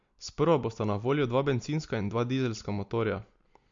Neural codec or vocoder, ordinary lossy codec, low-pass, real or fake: none; MP3, 48 kbps; 7.2 kHz; real